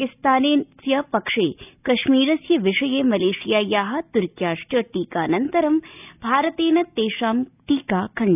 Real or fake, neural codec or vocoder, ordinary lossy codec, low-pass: real; none; none; 3.6 kHz